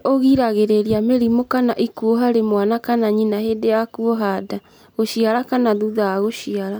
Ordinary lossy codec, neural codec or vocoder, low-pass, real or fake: none; none; none; real